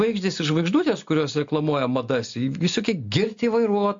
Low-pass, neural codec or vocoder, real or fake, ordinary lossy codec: 7.2 kHz; none; real; MP3, 48 kbps